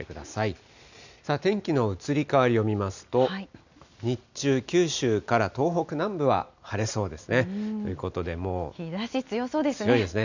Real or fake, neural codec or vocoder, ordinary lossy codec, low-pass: real; none; none; 7.2 kHz